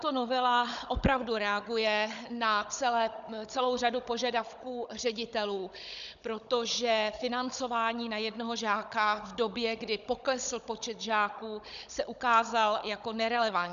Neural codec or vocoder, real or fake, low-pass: codec, 16 kHz, 16 kbps, FunCodec, trained on Chinese and English, 50 frames a second; fake; 7.2 kHz